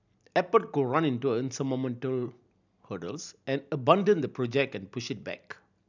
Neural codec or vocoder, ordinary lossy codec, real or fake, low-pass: none; none; real; 7.2 kHz